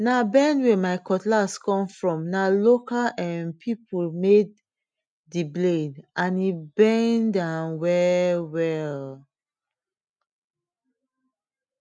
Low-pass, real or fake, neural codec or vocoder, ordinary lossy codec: 9.9 kHz; real; none; none